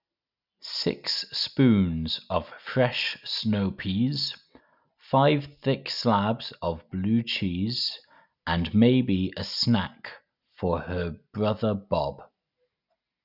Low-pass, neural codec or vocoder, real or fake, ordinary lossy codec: 5.4 kHz; none; real; none